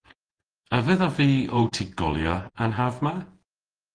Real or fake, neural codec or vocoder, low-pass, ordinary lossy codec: fake; vocoder, 48 kHz, 128 mel bands, Vocos; 9.9 kHz; Opus, 16 kbps